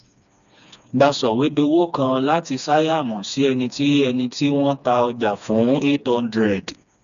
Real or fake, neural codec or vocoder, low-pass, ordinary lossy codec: fake; codec, 16 kHz, 2 kbps, FreqCodec, smaller model; 7.2 kHz; none